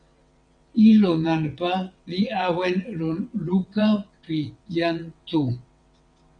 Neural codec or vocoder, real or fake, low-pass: vocoder, 22.05 kHz, 80 mel bands, WaveNeXt; fake; 9.9 kHz